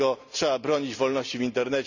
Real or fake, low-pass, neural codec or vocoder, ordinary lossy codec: real; 7.2 kHz; none; none